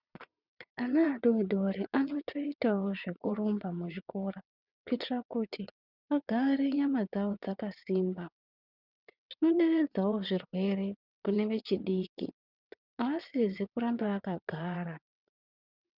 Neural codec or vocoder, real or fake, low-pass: vocoder, 22.05 kHz, 80 mel bands, WaveNeXt; fake; 5.4 kHz